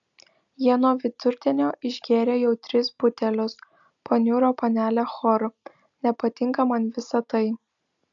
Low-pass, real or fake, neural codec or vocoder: 7.2 kHz; real; none